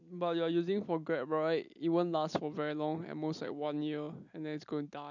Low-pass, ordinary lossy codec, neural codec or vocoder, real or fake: 7.2 kHz; none; none; real